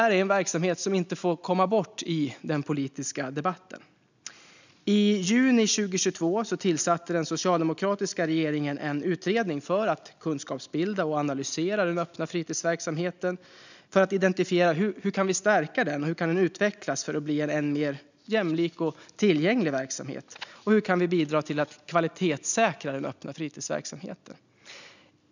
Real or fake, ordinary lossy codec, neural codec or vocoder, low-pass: real; none; none; 7.2 kHz